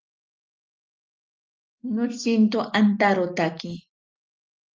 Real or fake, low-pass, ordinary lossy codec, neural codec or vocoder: real; 7.2 kHz; Opus, 24 kbps; none